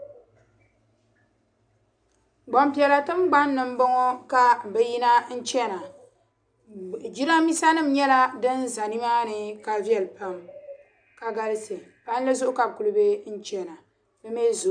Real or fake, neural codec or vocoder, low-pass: real; none; 9.9 kHz